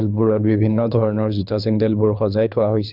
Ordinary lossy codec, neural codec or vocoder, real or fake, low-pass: none; codec, 24 kHz, 3 kbps, HILCodec; fake; 5.4 kHz